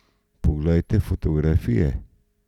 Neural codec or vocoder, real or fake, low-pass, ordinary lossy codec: none; real; 19.8 kHz; none